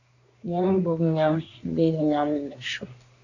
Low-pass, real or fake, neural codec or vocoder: 7.2 kHz; fake; codec, 24 kHz, 1 kbps, SNAC